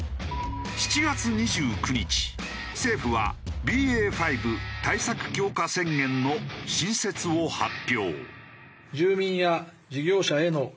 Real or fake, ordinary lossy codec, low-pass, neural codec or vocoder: real; none; none; none